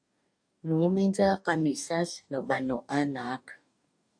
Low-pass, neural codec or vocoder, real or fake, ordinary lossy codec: 9.9 kHz; codec, 44.1 kHz, 2.6 kbps, DAC; fake; AAC, 64 kbps